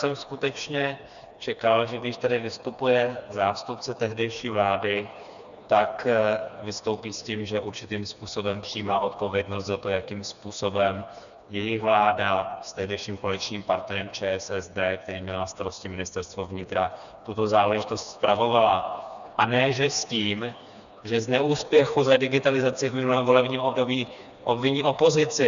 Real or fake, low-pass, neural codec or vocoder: fake; 7.2 kHz; codec, 16 kHz, 2 kbps, FreqCodec, smaller model